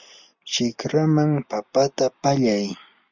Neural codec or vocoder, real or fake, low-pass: none; real; 7.2 kHz